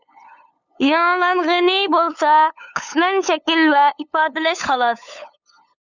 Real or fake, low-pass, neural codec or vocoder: fake; 7.2 kHz; codec, 16 kHz, 8 kbps, FunCodec, trained on LibriTTS, 25 frames a second